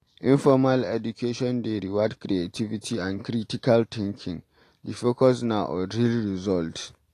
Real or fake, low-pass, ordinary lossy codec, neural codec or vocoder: real; 14.4 kHz; AAC, 48 kbps; none